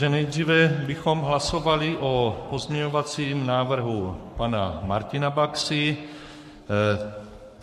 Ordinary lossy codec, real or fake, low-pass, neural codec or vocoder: MP3, 64 kbps; fake; 14.4 kHz; codec, 44.1 kHz, 7.8 kbps, Pupu-Codec